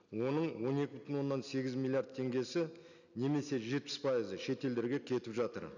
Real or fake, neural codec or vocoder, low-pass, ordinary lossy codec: real; none; 7.2 kHz; none